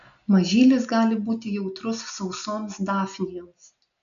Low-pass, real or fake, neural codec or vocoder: 7.2 kHz; real; none